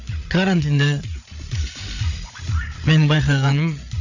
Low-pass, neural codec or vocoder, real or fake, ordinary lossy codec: 7.2 kHz; codec, 16 kHz, 8 kbps, FreqCodec, larger model; fake; none